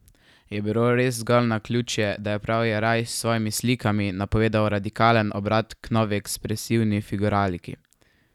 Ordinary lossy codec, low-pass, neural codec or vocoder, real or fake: none; 19.8 kHz; none; real